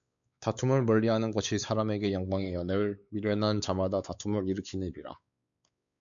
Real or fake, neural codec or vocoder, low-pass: fake; codec, 16 kHz, 4 kbps, X-Codec, WavLM features, trained on Multilingual LibriSpeech; 7.2 kHz